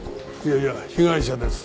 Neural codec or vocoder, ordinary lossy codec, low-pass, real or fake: none; none; none; real